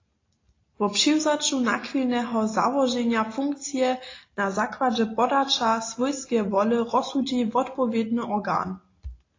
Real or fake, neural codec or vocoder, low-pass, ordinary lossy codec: real; none; 7.2 kHz; AAC, 32 kbps